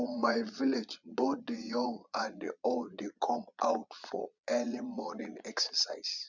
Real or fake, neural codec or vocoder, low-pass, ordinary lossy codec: fake; vocoder, 22.05 kHz, 80 mel bands, Vocos; 7.2 kHz; none